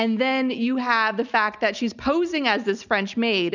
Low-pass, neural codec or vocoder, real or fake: 7.2 kHz; none; real